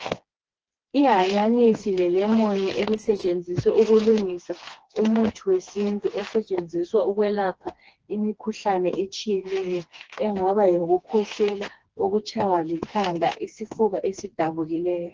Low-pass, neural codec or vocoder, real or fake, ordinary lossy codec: 7.2 kHz; codec, 16 kHz, 2 kbps, FreqCodec, smaller model; fake; Opus, 16 kbps